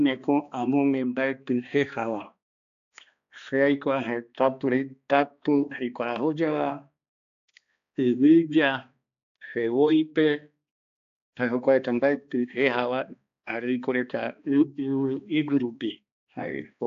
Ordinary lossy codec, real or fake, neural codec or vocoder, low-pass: AAC, 64 kbps; fake; codec, 16 kHz, 2 kbps, X-Codec, HuBERT features, trained on balanced general audio; 7.2 kHz